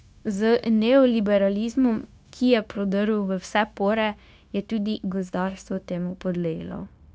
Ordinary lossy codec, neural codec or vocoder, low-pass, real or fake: none; codec, 16 kHz, 0.9 kbps, LongCat-Audio-Codec; none; fake